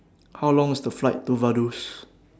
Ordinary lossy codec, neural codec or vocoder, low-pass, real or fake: none; none; none; real